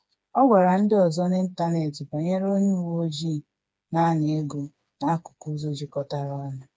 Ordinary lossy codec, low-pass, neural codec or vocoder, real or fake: none; none; codec, 16 kHz, 4 kbps, FreqCodec, smaller model; fake